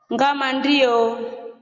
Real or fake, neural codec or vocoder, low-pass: real; none; 7.2 kHz